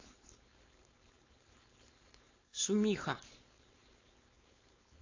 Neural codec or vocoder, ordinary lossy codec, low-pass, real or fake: codec, 16 kHz, 4.8 kbps, FACodec; AAC, 32 kbps; 7.2 kHz; fake